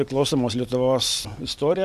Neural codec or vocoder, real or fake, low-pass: vocoder, 44.1 kHz, 128 mel bands every 256 samples, BigVGAN v2; fake; 14.4 kHz